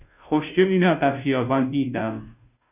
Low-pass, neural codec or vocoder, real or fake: 3.6 kHz; codec, 16 kHz, 0.5 kbps, FunCodec, trained on Chinese and English, 25 frames a second; fake